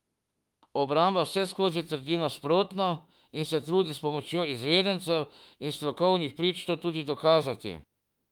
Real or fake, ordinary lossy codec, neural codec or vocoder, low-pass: fake; Opus, 32 kbps; autoencoder, 48 kHz, 32 numbers a frame, DAC-VAE, trained on Japanese speech; 19.8 kHz